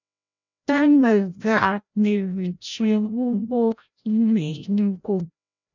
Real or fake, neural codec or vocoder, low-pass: fake; codec, 16 kHz, 0.5 kbps, FreqCodec, larger model; 7.2 kHz